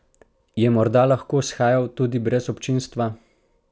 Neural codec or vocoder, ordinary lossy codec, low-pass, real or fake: none; none; none; real